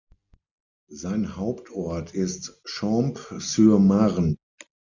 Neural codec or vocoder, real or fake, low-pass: none; real; 7.2 kHz